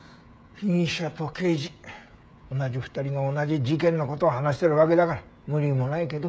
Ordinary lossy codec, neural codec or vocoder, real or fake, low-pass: none; codec, 16 kHz, 16 kbps, FreqCodec, smaller model; fake; none